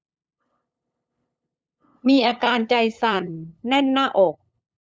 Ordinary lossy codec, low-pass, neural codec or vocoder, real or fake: none; none; codec, 16 kHz, 8 kbps, FunCodec, trained on LibriTTS, 25 frames a second; fake